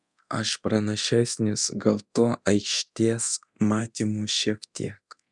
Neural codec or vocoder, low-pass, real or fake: codec, 24 kHz, 0.9 kbps, DualCodec; 10.8 kHz; fake